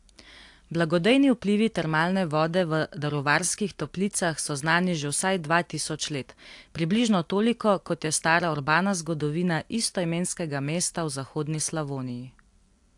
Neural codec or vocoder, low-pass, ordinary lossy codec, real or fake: none; 10.8 kHz; AAC, 64 kbps; real